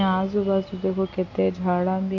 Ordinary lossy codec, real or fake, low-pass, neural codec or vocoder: AAC, 48 kbps; real; 7.2 kHz; none